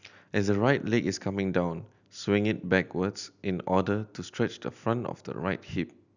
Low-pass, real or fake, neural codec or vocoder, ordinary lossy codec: 7.2 kHz; real; none; none